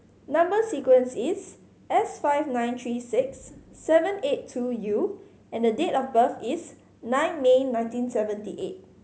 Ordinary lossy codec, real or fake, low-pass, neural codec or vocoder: none; real; none; none